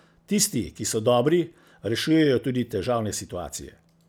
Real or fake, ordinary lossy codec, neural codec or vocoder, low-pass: real; none; none; none